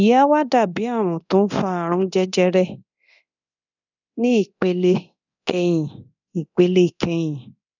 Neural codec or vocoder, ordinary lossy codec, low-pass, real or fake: codec, 24 kHz, 0.9 kbps, DualCodec; none; 7.2 kHz; fake